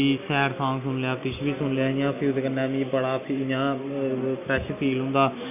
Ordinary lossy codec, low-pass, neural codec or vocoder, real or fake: none; 3.6 kHz; none; real